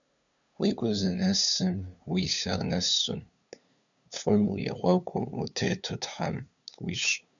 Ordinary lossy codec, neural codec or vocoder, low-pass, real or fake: MP3, 96 kbps; codec, 16 kHz, 2 kbps, FunCodec, trained on LibriTTS, 25 frames a second; 7.2 kHz; fake